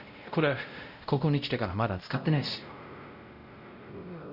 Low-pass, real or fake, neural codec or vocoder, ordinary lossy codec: 5.4 kHz; fake; codec, 16 kHz, 0.5 kbps, X-Codec, WavLM features, trained on Multilingual LibriSpeech; Opus, 64 kbps